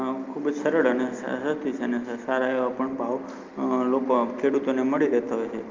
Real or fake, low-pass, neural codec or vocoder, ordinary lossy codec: real; 7.2 kHz; none; Opus, 24 kbps